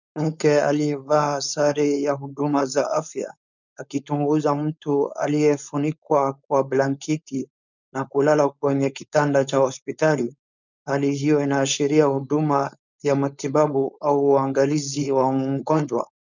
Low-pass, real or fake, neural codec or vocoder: 7.2 kHz; fake; codec, 16 kHz, 4.8 kbps, FACodec